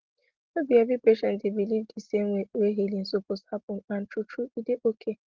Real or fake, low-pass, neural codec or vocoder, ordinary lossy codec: real; 7.2 kHz; none; Opus, 16 kbps